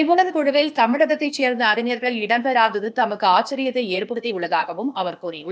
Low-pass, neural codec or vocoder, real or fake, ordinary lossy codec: none; codec, 16 kHz, 0.8 kbps, ZipCodec; fake; none